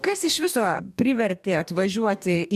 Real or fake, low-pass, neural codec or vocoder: fake; 14.4 kHz; codec, 44.1 kHz, 2.6 kbps, DAC